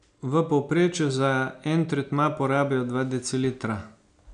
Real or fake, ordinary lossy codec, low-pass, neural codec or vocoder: real; none; 9.9 kHz; none